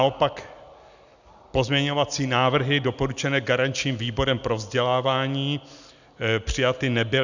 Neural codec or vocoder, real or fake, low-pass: none; real; 7.2 kHz